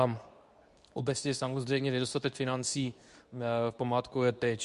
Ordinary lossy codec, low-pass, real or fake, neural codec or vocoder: Opus, 64 kbps; 10.8 kHz; fake; codec, 24 kHz, 0.9 kbps, WavTokenizer, medium speech release version 2